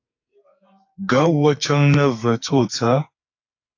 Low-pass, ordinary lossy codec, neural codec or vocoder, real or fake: 7.2 kHz; AAC, 48 kbps; codec, 44.1 kHz, 2.6 kbps, SNAC; fake